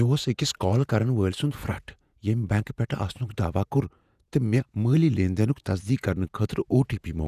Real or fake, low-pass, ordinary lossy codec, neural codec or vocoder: fake; 14.4 kHz; none; vocoder, 48 kHz, 128 mel bands, Vocos